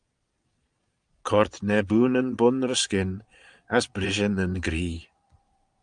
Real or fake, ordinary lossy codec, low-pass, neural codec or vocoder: fake; Opus, 32 kbps; 9.9 kHz; vocoder, 22.05 kHz, 80 mel bands, Vocos